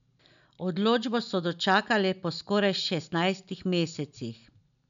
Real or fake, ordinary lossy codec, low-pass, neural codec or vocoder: real; MP3, 96 kbps; 7.2 kHz; none